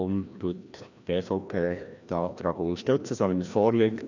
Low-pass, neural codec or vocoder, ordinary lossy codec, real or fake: 7.2 kHz; codec, 16 kHz, 1 kbps, FreqCodec, larger model; none; fake